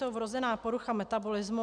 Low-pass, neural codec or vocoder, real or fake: 9.9 kHz; none; real